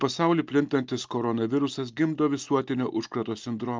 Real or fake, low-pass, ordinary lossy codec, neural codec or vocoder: real; 7.2 kHz; Opus, 24 kbps; none